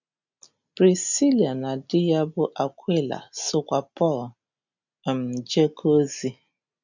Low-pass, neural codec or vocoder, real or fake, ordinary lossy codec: 7.2 kHz; none; real; none